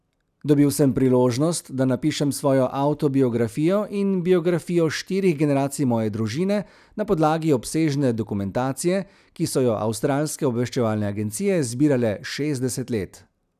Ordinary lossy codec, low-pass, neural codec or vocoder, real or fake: none; 14.4 kHz; none; real